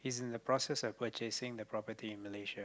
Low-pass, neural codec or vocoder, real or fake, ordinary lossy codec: none; none; real; none